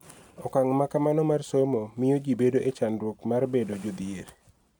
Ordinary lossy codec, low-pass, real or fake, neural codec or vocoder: none; 19.8 kHz; real; none